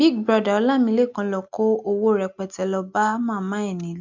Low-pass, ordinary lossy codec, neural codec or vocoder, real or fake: 7.2 kHz; none; none; real